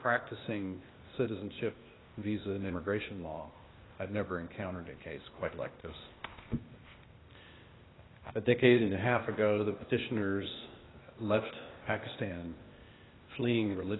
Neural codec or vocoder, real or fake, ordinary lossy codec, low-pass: codec, 16 kHz, 0.8 kbps, ZipCodec; fake; AAC, 16 kbps; 7.2 kHz